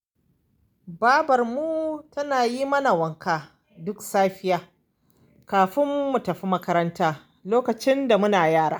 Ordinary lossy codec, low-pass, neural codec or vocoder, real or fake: none; none; none; real